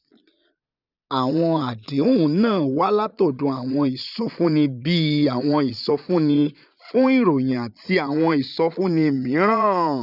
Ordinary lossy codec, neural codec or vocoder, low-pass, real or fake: none; vocoder, 22.05 kHz, 80 mel bands, Vocos; 5.4 kHz; fake